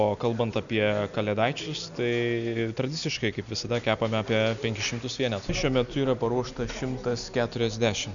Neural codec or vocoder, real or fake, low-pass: none; real; 7.2 kHz